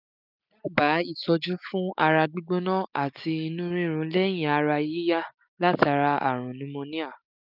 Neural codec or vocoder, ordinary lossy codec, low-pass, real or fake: none; none; 5.4 kHz; real